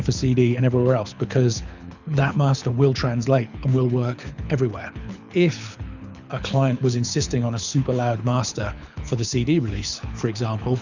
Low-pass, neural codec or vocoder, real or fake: 7.2 kHz; codec, 24 kHz, 6 kbps, HILCodec; fake